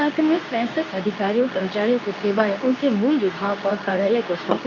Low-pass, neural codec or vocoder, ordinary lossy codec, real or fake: 7.2 kHz; codec, 24 kHz, 0.9 kbps, WavTokenizer, medium speech release version 2; none; fake